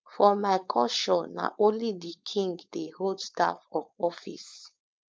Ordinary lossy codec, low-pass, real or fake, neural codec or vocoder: none; none; fake; codec, 16 kHz, 4.8 kbps, FACodec